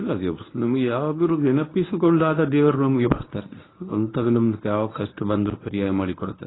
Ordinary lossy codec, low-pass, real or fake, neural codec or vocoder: AAC, 16 kbps; 7.2 kHz; fake; codec, 24 kHz, 0.9 kbps, WavTokenizer, medium speech release version 1